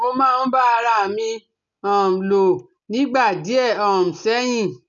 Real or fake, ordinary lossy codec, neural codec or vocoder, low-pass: real; none; none; 7.2 kHz